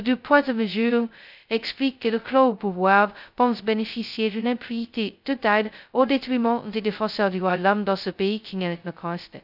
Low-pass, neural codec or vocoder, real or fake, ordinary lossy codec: 5.4 kHz; codec, 16 kHz, 0.2 kbps, FocalCodec; fake; none